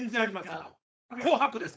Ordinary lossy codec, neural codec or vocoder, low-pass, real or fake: none; codec, 16 kHz, 4.8 kbps, FACodec; none; fake